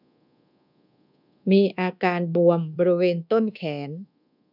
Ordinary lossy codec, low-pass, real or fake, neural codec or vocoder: none; 5.4 kHz; fake; codec, 24 kHz, 1.2 kbps, DualCodec